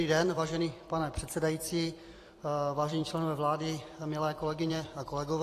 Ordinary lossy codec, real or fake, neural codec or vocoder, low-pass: AAC, 48 kbps; fake; vocoder, 44.1 kHz, 128 mel bands every 256 samples, BigVGAN v2; 14.4 kHz